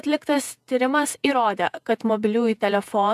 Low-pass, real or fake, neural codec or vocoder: 14.4 kHz; fake; vocoder, 48 kHz, 128 mel bands, Vocos